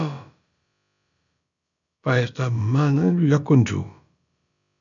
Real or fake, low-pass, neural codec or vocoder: fake; 7.2 kHz; codec, 16 kHz, about 1 kbps, DyCAST, with the encoder's durations